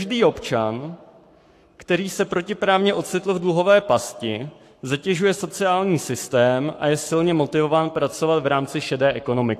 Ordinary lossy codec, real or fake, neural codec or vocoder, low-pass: AAC, 64 kbps; fake; codec, 44.1 kHz, 7.8 kbps, Pupu-Codec; 14.4 kHz